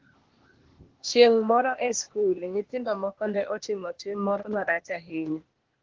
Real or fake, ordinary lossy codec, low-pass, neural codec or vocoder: fake; Opus, 16 kbps; 7.2 kHz; codec, 16 kHz, 0.8 kbps, ZipCodec